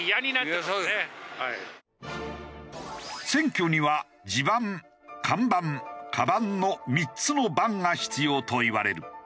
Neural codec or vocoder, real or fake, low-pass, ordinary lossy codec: none; real; none; none